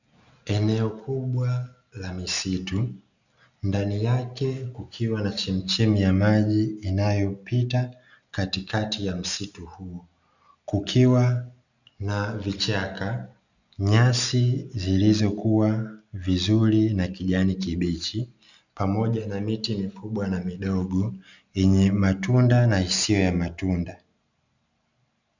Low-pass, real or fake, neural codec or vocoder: 7.2 kHz; real; none